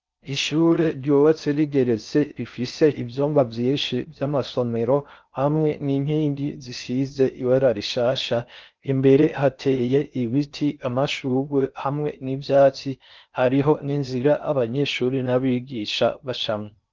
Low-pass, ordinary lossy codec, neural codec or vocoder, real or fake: 7.2 kHz; Opus, 32 kbps; codec, 16 kHz in and 24 kHz out, 0.6 kbps, FocalCodec, streaming, 4096 codes; fake